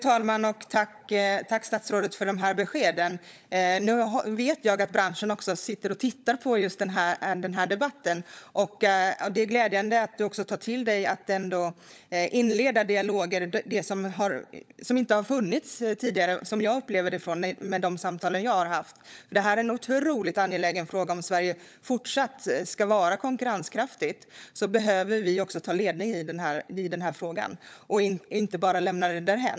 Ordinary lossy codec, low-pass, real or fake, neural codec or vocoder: none; none; fake; codec, 16 kHz, 16 kbps, FunCodec, trained on LibriTTS, 50 frames a second